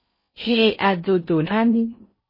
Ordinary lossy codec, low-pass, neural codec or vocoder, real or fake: MP3, 24 kbps; 5.4 kHz; codec, 16 kHz in and 24 kHz out, 0.6 kbps, FocalCodec, streaming, 4096 codes; fake